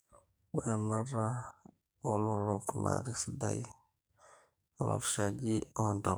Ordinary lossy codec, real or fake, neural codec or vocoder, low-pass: none; fake; codec, 44.1 kHz, 2.6 kbps, SNAC; none